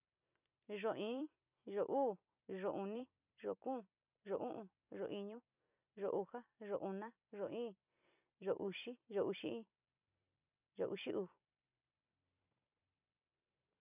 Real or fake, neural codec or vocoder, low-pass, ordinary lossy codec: fake; vocoder, 44.1 kHz, 128 mel bands every 256 samples, BigVGAN v2; 3.6 kHz; none